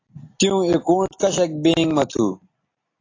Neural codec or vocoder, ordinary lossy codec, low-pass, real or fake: none; AAC, 32 kbps; 7.2 kHz; real